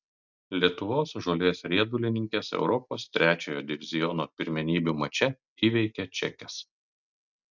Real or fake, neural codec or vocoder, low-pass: real; none; 7.2 kHz